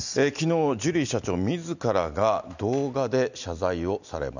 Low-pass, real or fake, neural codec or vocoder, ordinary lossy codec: 7.2 kHz; real; none; none